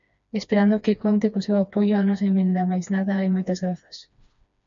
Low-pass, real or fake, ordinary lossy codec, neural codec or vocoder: 7.2 kHz; fake; MP3, 64 kbps; codec, 16 kHz, 2 kbps, FreqCodec, smaller model